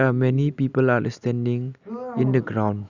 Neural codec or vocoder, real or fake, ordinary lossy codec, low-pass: none; real; none; 7.2 kHz